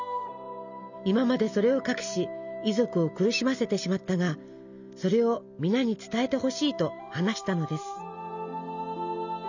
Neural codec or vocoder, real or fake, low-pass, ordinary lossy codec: none; real; 7.2 kHz; none